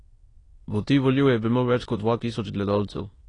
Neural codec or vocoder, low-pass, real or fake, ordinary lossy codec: autoencoder, 22.05 kHz, a latent of 192 numbers a frame, VITS, trained on many speakers; 9.9 kHz; fake; AAC, 32 kbps